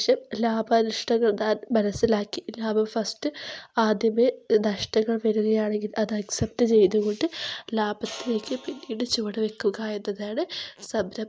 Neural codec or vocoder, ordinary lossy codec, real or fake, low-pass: none; none; real; none